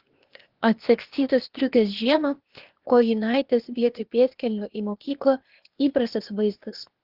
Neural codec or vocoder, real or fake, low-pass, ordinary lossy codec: codec, 16 kHz, 0.8 kbps, ZipCodec; fake; 5.4 kHz; Opus, 16 kbps